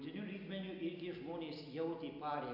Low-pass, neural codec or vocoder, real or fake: 5.4 kHz; none; real